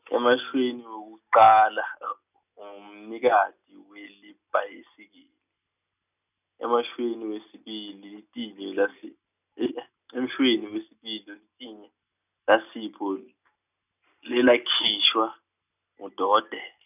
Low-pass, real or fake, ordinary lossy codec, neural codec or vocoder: 3.6 kHz; real; none; none